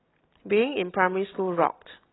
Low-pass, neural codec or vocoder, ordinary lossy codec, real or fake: 7.2 kHz; none; AAC, 16 kbps; real